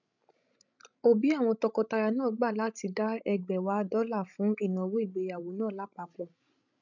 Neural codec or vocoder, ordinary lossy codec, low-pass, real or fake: codec, 16 kHz, 16 kbps, FreqCodec, larger model; none; 7.2 kHz; fake